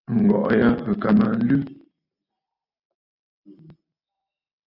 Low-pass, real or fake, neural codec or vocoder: 5.4 kHz; real; none